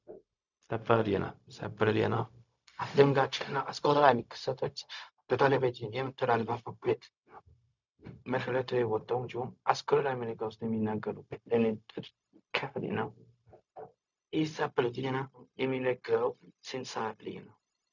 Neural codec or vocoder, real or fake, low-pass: codec, 16 kHz, 0.4 kbps, LongCat-Audio-Codec; fake; 7.2 kHz